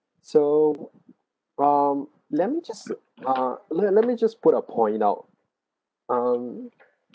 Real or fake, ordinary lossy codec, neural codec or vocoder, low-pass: real; none; none; none